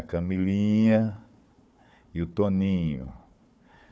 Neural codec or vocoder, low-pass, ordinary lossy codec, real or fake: codec, 16 kHz, 4 kbps, FunCodec, trained on Chinese and English, 50 frames a second; none; none; fake